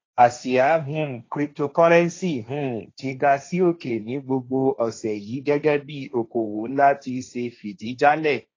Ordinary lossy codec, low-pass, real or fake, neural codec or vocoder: AAC, 32 kbps; 7.2 kHz; fake; codec, 16 kHz, 1.1 kbps, Voila-Tokenizer